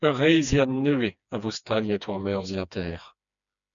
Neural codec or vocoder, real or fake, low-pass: codec, 16 kHz, 2 kbps, FreqCodec, smaller model; fake; 7.2 kHz